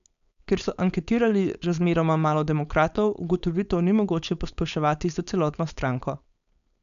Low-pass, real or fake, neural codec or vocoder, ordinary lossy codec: 7.2 kHz; fake; codec, 16 kHz, 4.8 kbps, FACodec; none